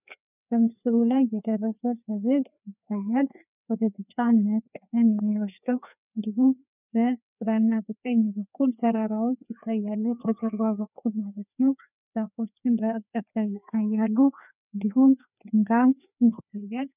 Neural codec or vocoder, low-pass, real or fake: codec, 16 kHz, 2 kbps, FreqCodec, larger model; 3.6 kHz; fake